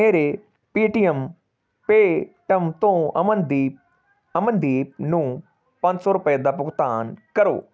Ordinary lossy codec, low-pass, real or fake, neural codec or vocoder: none; none; real; none